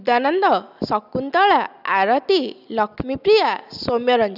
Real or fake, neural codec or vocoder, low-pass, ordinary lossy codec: real; none; 5.4 kHz; none